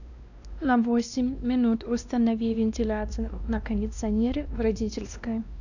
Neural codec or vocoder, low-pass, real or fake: codec, 16 kHz, 1 kbps, X-Codec, WavLM features, trained on Multilingual LibriSpeech; 7.2 kHz; fake